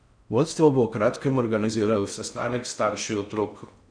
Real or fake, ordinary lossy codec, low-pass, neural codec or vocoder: fake; none; 9.9 kHz; codec, 16 kHz in and 24 kHz out, 0.6 kbps, FocalCodec, streaming, 4096 codes